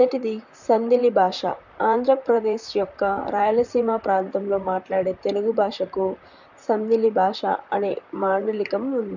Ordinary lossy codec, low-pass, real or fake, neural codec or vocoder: none; 7.2 kHz; fake; vocoder, 44.1 kHz, 128 mel bands, Pupu-Vocoder